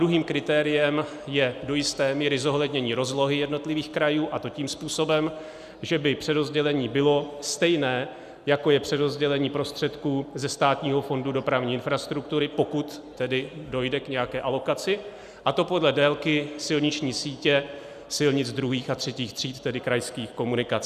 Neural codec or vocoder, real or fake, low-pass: none; real; 14.4 kHz